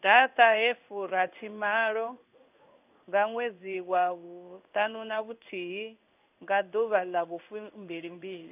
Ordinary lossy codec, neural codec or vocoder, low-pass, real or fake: none; codec, 16 kHz in and 24 kHz out, 1 kbps, XY-Tokenizer; 3.6 kHz; fake